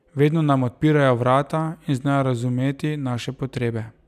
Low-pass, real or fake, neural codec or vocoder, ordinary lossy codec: 14.4 kHz; real; none; none